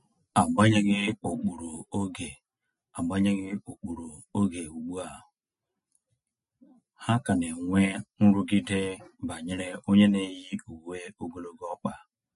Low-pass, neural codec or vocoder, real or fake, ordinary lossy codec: 14.4 kHz; none; real; MP3, 48 kbps